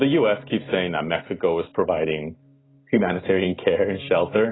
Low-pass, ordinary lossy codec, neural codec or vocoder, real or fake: 7.2 kHz; AAC, 16 kbps; none; real